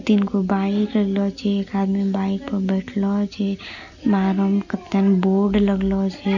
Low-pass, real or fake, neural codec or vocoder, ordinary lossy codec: 7.2 kHz; real; none; none